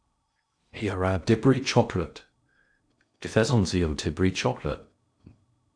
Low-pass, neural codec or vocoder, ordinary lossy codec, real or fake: 9.9 kHz; codec, 16 kHz in and 24 kHz out, 0.6 kbps, FocalCodec, streaming, 2048 codes; AAC, 64 kbps; fake